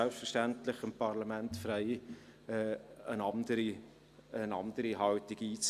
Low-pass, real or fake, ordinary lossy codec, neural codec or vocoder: 14.4 kHz; real; Opus, 64 kbps; none